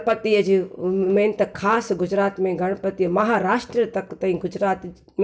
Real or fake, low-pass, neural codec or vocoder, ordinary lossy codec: real; none; none; none